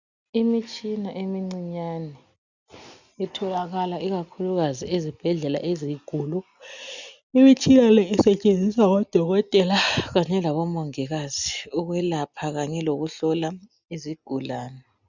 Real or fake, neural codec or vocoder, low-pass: real; none; 7.2 kHz